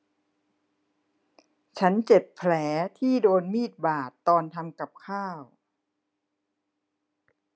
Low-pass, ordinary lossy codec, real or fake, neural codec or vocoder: none; none; real; none